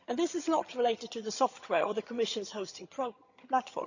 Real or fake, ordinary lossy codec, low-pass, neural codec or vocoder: fake; none; 7.2 kHz; vocoder, 22.05 kHz, 80 mel bands, HiFi-GAN